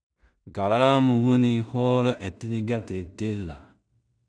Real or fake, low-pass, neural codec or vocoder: fake; 9.9 kHz; codec, 16 kHz in and 24 kHz out, 0.4 kbps, LongCat-Audio-Codec, two codebook decoder